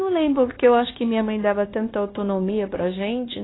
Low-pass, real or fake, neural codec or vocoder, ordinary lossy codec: 7.2 kHz; fake; codec, 16 kHz, 0.9 kbps, LongCat-Audio-Codec; AAC, 16 kbps